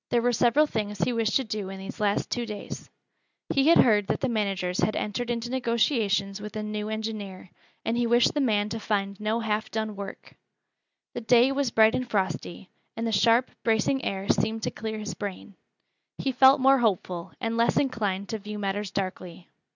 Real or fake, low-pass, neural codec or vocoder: real; 7.2 kHz; none